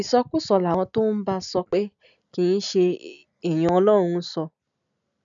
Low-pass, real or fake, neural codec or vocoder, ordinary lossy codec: 7.2 kHz; real; none; none